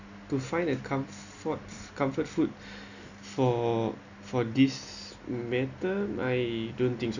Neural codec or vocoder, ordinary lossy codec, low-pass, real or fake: none; none; 7.2 kHz; real